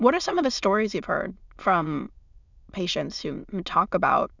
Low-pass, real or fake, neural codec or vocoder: 7.2 kHz; fake; autoencoder, 22.05 kHz, a latent of 192 numbers a frame, VITS, trained on many speakers